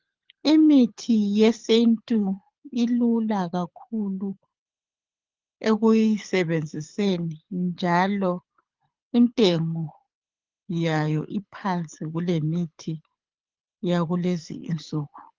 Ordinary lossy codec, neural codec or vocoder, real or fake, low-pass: Opus, 32 kbps; codec, 24 kHz, 6 kbps, HILCodec; fake; 7.2 kHz